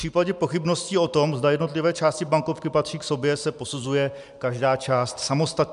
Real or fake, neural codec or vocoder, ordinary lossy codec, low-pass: real; none; MP3, 96 kbps; 10.8 kHz